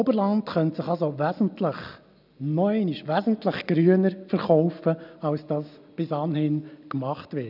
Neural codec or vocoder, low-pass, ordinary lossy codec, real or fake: none; 5.4 kHz; none; real